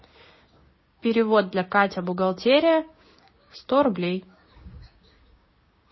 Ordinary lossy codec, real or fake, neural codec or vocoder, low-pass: MP3, 24 kbps; fake; codec, 16 kHz, 6 kbps, DAC; 7.2 kHz